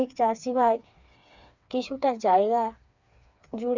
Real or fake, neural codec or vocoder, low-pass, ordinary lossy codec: fake; codec, 16 kHz, 4 kbps, FreqCodec, smaller model; 7.2 kHz; none